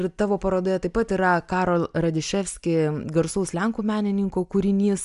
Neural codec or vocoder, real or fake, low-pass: none; real; 10.8 kHz